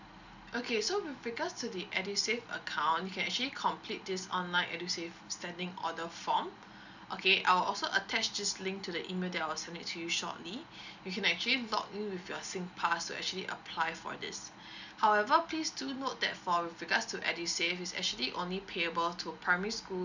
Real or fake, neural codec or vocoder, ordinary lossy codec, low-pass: real; none; none; 7.2 kHz